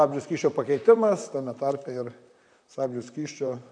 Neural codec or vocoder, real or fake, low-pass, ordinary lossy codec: vocoder, 44.1 kHz, 128 mel bands, Pupu-Vocoder; fake; 9.9 kHz; MP3, 96 kbps